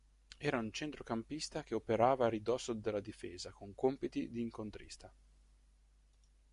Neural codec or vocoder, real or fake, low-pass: none; real; 10.8 kHz